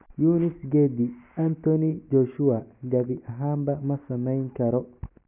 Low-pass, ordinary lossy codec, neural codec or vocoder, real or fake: 3.6 kHz; none; none; real